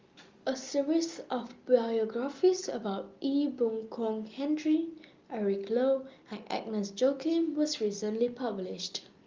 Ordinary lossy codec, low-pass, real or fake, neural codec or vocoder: Opus, 32 kbps; 7.2 kHz; real; none